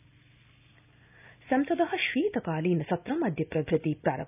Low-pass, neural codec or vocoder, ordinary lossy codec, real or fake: 3.6 kHz; none; none; real